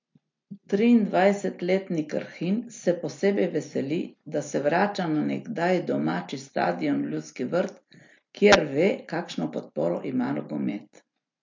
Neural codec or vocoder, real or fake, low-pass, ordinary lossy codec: none; real; 7.2 kHz; MP3, 48 kbps